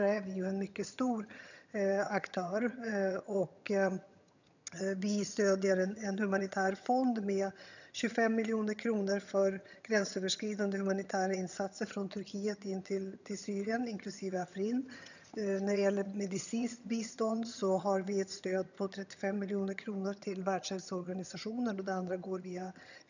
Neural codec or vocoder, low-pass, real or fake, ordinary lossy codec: vocoder, 22.05 kHz, 80 mel bands, HiFi-GAN; 7.2 kHz; fake; none